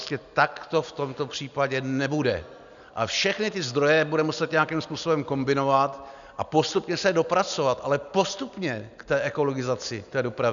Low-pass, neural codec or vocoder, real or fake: 7.2 kHz; none; real